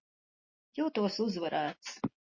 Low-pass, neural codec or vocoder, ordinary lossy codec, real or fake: 7.2 kHz; none; MP3, 32 kbps; real